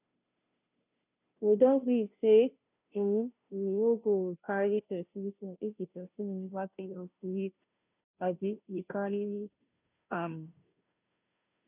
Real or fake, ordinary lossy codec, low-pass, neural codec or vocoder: fake; none; 3.6 kHz; codec, 16 kHz, 0.5 kbps, FunCodec, trained on Chinese and English, 25 frames a second